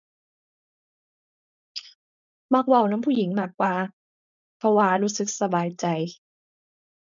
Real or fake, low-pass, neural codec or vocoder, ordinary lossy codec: fake; 7.2 kHz; codec, 16 kHz, 4.8 kbps, FACodec; none